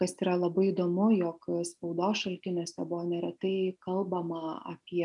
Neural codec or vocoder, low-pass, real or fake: none; 10.8 kHz; real